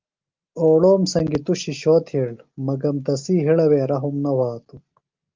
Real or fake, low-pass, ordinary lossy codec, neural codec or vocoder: real; 7.2 kHz; Opus, 24 kbps; none